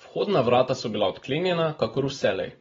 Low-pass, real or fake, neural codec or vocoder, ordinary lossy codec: 7.2 kHz; real; none; AAC, 24 kbps